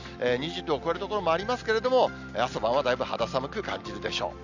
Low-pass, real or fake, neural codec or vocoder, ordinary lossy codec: 7.2 kHz; real; none; none